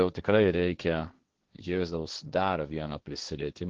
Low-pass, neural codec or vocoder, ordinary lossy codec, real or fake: 7.2 kHz; codec, 16 kHz, 1.1 kbps, Voila-Tokenizer; Opus, 16 kbps; fake